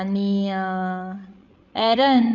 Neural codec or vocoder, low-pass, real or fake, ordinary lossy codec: codec, 16 kHz, 16 kbps, FreqCodec, larger model; 7.2 kHz; fake; none